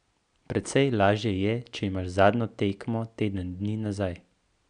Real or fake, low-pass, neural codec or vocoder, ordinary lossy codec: real; 9.9 kHz; none; none